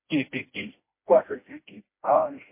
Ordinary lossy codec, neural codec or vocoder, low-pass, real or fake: MP3, 24 kbps; codec, 16 kHz, 1 kbps, FreqCodec, smaller model; 3.6 kHz; fake